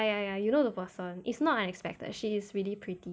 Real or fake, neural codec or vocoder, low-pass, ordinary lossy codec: real; none; none; none